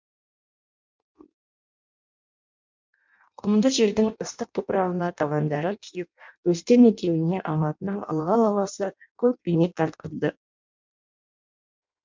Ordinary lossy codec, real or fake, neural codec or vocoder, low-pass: MP3, 64 kbps; fake; codec, 16 kHz in and 24 kHz out, 0.6 kbps, FireRedTTS-2 codec; 7.2 kHz